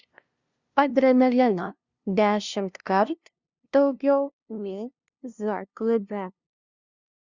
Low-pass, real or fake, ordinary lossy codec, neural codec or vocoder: 7.2 kHz; fake; Opus, 64 kbps; codec, 16 kHz, 0.5 kbps, FunCodec, trained on LibriTTS, 25 frames a second